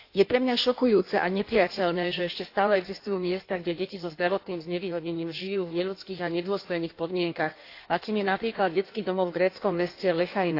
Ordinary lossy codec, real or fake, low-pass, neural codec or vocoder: AAC, 32 kbps; fake; 5.4 kHz; codec, 16 kHz in and 24 kHz out, 1.1 kbps, FireRedTTS-2 codec